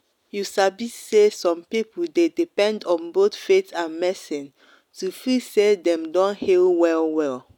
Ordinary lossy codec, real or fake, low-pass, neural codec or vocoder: none; real; 19.8 kHz; none